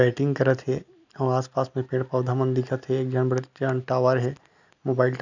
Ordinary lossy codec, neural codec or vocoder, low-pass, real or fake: none; none; 7.2 kHz; real